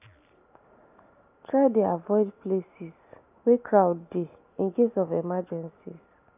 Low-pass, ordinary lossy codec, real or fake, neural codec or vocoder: 3.6 kHz; AAC, 24 kbps; real; none